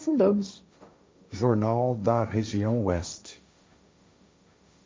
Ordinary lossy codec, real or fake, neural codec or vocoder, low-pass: none; fake; codec, 16 kHz, 1.1 kbps, Voila-Tokenizer; none